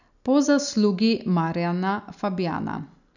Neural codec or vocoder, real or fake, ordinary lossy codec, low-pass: none; real; none; 7.2 kHz